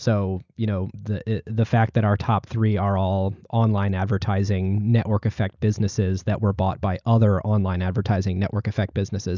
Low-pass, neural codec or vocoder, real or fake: 7.2 kHz; none; real